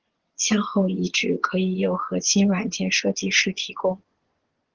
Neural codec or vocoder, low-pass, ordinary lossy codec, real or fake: vocoder, 24 kHz, 100 mel bands, Vocos; 7.2 kHz; Opus, 16 kbps; fake